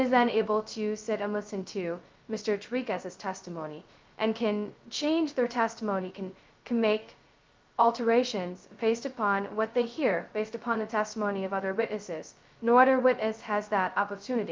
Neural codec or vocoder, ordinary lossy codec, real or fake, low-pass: codec, 16 kHz, 0.2 kbps, FocalCodec; Opus, 24 kbps; fake; 7.2 kHz